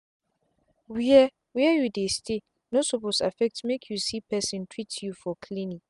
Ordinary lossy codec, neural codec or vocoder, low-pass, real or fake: none; none; 10.8 kHz; real